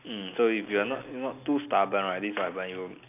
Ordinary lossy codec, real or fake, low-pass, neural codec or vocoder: none; real; 3.6 kHz; none